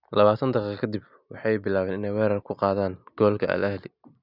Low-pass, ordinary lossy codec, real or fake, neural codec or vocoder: 5.4 kHz; none; real; none